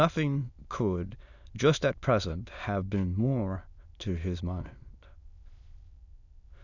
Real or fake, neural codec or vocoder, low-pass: fake; autoencoder, 22.05 kHz, a latent of 192 numbers a frame, VITS, trained on many speakers; 7.2 kHz